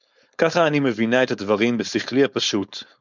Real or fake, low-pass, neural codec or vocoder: fake; 7.2 kHz; codec, 16 kHz, 4.8 kbps, FACodec